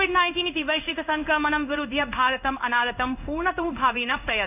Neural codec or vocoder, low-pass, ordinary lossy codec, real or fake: codec, 16 kHz in and 24 kHz out, 1 kbps, XY-Tokenizer; 3.6 kHz; none; fake